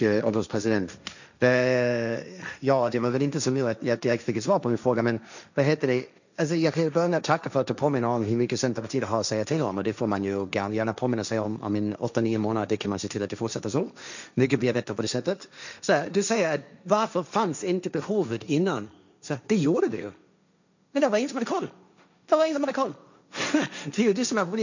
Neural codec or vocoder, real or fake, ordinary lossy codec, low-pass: codec, 16 kHz, 1.1 kbps, Voila-Tokenizer; fake; none; 7.2 kHz